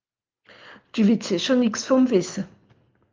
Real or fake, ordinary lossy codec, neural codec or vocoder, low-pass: real; Opus, 24 kbps; none; 7.2 kHz